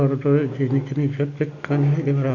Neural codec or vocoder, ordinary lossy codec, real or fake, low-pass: none; none; real; 7.2 kHz